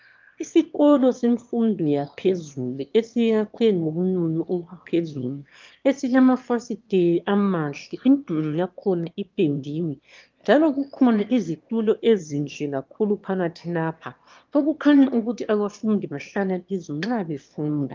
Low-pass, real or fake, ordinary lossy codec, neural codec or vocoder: 7.2 kHz; fake; Opus, 24 kbps; autoencoder, 22.05 kHz, a latent of 192 numbers a frame, VITS, trained on one speaker